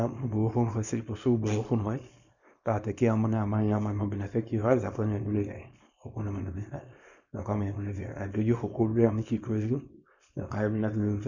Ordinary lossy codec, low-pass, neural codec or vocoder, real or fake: none; 7.2 kHz; codec, 24 kHz, 0.9 kbps, WavTokenizer, small release; fake